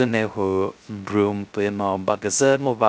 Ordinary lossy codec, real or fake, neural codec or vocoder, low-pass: none; fake; codec, 16 kHz, 0.3 kbps, FocalCodec; none